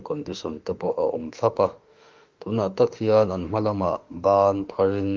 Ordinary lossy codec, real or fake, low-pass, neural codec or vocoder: Opus, 24 kbps; fake; 7.2 kHz; autoencoder, 48 kHz, 32 numbers a frame, DAC-VAE, trained on Japanese speech